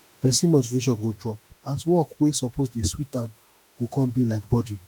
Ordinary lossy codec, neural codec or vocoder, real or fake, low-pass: none; autoencoder, 48 kHz, 32 numbers a frame, DAC-VAE, trained on Japanese speech; fake; none